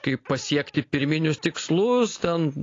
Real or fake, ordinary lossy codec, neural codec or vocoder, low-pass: real; AAC, 32 kbps; none; 7.2 kHz